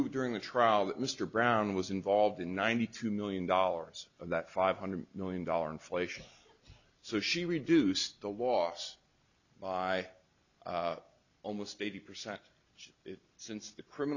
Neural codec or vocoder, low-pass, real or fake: none; 7.2 kHz; real